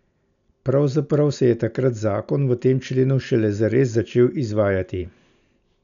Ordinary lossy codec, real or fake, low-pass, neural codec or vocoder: none; real; 7.2 kHz; none